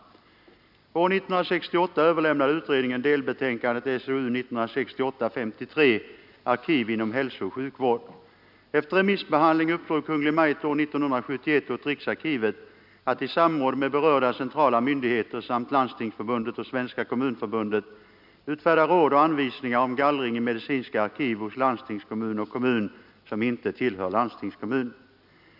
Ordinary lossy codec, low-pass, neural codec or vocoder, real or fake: none; 5.4 kHz; none; real